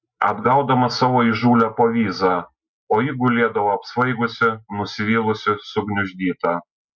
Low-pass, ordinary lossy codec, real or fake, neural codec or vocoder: 7.2 kHz; MP3, 48 kbps; real; none